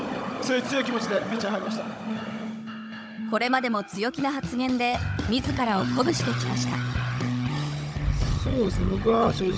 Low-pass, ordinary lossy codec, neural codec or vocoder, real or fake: none; none; codec, 16 kHz, 16 kbps, FunCodec, trained on Chinese and English, 50 frames a second; fake